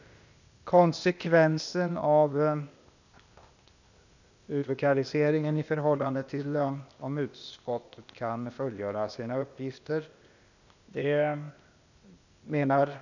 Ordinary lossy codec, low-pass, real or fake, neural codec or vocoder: none; 7.2 kHz; fake; codec, 16 kHz, 0.8 kbps, ZipCodec